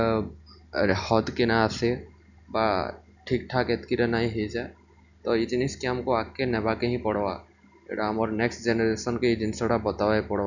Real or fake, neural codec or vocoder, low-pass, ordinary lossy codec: real; none; 7.2 kHz; none